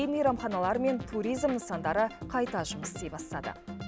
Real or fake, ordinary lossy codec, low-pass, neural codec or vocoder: real; none; none; none